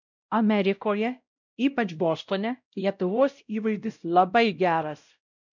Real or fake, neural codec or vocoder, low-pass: fake; codec, 16 kHz, 0.5 kbps, X-Codec, WavLM features, trained on Multilingual LibriSpeech; 7.2 kHz